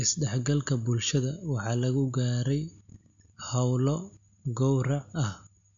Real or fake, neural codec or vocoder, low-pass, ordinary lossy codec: real; none; 7.2 kHz; MP3, 64 kbps